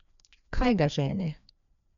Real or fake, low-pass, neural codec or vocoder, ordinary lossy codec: fake; 7.2 kHz; codec, 16 kHz, 2 kbps, FreqCodec, larger model; none